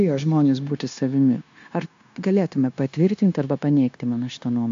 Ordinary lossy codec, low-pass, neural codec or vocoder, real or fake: AAC, 64 kbps; 7.2 kHz; codec, 16 kHz, 0.9 kbps, LongCat-Audio-Codec; fake